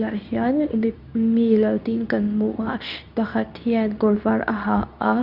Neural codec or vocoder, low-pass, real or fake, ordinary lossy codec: codec, 24 kHz, 0.9 kbps, WavTokenizer, medium speech release version 2; 5.4 kHz; fake; none